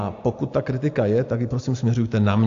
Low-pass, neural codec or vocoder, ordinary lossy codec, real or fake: 7.2 kHz; none; AAC, 48 kbps; real